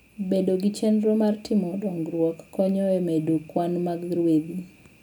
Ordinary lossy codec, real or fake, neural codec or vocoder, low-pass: none; real; none; none